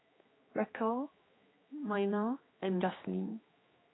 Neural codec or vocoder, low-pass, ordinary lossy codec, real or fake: codec, 16 kHz, 2 kbps, X-Codec, HuBERT features, trained on general audio; 7.2 kHz; AAC, 16 kbps; fake